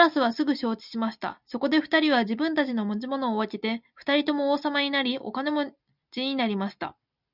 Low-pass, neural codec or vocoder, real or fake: 5.4 kHz; none; real